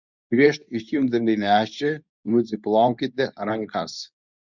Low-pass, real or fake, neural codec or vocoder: 7.2 kHz; fake; codec, 24 kHz, 0.9 kbps, WavTokenizer, medium speech release version 2